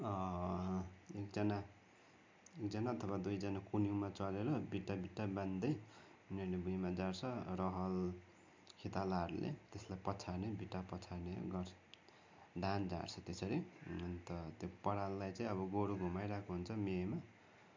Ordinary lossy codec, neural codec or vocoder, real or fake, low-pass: MP3, 64 kbps; none; real; 7.2 kHz